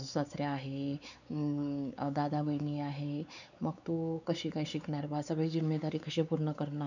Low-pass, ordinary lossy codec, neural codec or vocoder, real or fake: 7.2 kHz; none; codec, 16 kHz, 4 kbps, X-Codec, WavLM features, trained on Multilingual LibriSpeech; fake